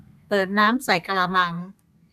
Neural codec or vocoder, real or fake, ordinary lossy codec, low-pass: codec, 32 kHz, 1.9 kbps, SNAC; fake; none; 14.4 kHz